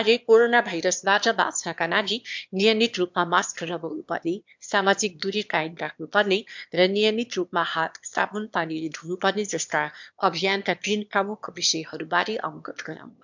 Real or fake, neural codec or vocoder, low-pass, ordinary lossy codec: fake; autoencoder, 22.05 kHz, a latent of 192 numbers a frame, VITS, trained on one speaker; 7.2 kHz; MP3, 64 kbps